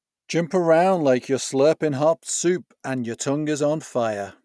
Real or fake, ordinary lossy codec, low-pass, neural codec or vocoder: real; none; none; none